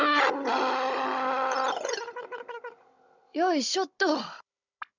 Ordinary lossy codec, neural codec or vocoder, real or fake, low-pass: none; codec, 16 kHz, 16 kbps, FunCodec, trained on Chinese and English, 50 frames a second; fake; 7.2 kHz